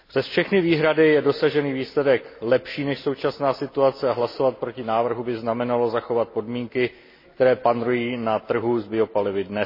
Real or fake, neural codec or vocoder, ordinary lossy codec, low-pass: real; none; MP3, 24 kbps; 5.4 kHz